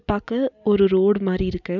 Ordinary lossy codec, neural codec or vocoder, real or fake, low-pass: none; none; real; 7.2 kHz